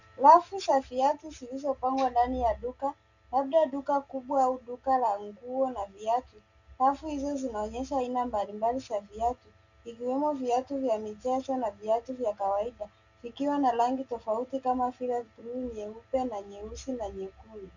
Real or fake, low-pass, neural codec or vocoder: real; 7.2 kHz; none